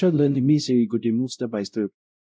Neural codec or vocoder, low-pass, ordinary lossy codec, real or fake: codec, 16 kHz, 0.5 kbps, X-Codec, WavLM features, trained on Multilingual LibriSpeech; none; none; fake